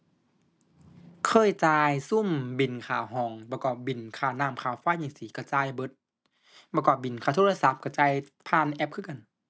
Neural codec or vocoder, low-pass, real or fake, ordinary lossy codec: none; none; real; none